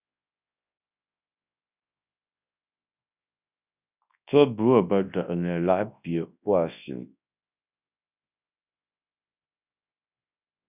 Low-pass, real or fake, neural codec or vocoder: 3.6 kHz; fake; codec, 24 kHz, 0.9 kbps, WavTokenizer, large speech release